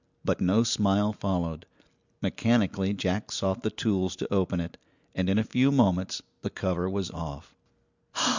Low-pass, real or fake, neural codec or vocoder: 7.2 kHz; real; none